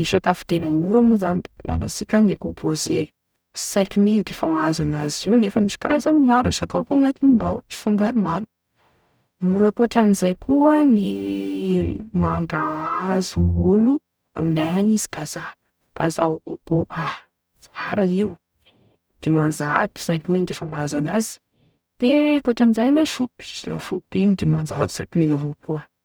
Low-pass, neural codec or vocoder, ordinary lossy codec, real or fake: none; codec, 44.1 kHz, 0.9 kbps, DAC; none; fake